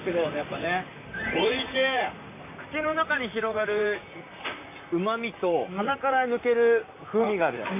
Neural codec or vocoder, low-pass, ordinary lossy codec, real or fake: vocoder, 44.1 kHz, 128 mel bands, Pupu-Vocoder; 3.6 kHz; MP3, 24 kbps; fake